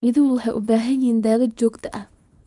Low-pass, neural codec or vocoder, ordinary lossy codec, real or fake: 10.8 kHz; codec, 24 kHz, 0.9 kbps, WavTokenizer, small release; none; fake